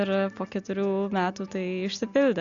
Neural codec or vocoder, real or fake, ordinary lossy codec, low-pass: none; real; Opus, 64 kbps; 7.2 kHz